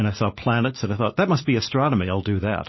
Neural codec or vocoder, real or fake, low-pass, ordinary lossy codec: none; real; 7.2 kHz; MP3, 24 kbps